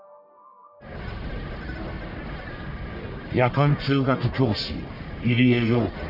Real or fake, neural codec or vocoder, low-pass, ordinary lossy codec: fake; codec, 44.1 kHz, 1.7 kbps, Pupu-Codec; 5.4 kHz; none